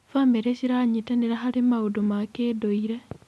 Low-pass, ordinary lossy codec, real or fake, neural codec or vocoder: none; none; real; none